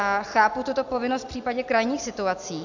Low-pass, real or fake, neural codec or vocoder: 7.2 kHz; real; none